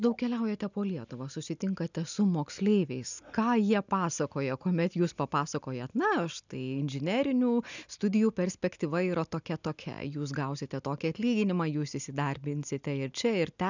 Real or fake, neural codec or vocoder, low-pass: real; none; 7.2 kHz